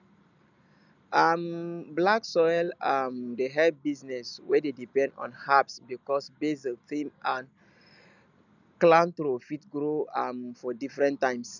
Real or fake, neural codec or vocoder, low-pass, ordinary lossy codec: real; none; 7.2 kHz; none